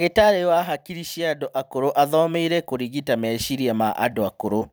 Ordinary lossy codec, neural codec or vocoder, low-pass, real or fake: none; vocoder, 44.1 kHz, 128 mel bands every 256 samples, BigVGAN v2; none; fake